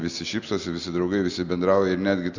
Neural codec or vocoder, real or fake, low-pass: none; real; 7.2 kHz